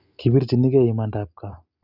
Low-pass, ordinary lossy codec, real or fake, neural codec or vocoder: 5.4 kHz; none; real; none